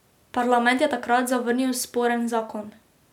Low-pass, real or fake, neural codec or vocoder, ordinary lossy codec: 19.8 kHz; real; none; none